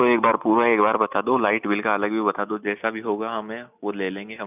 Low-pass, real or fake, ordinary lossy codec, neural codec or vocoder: 3.6 kHz; real; none; none